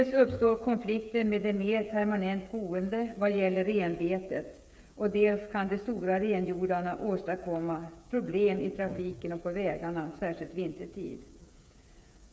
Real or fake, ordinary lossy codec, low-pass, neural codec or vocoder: fake; none; none; codec, 16 kHz, 8 kbps, FreqCodec, smaller model